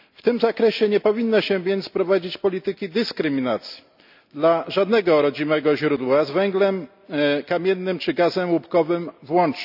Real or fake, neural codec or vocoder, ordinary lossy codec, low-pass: real; none; none; 5.4 kHz